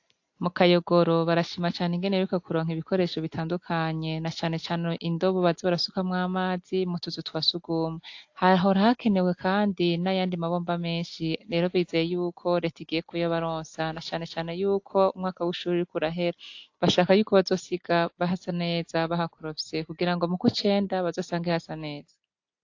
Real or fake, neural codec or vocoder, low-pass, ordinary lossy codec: real; none; 7.2 kHz; AAC, 48 kbps